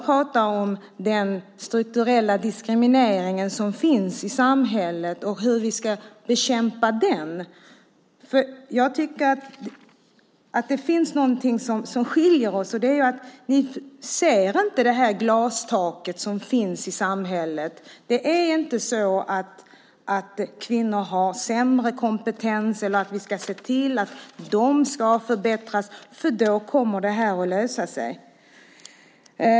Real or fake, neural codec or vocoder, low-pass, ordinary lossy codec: real; none; none; none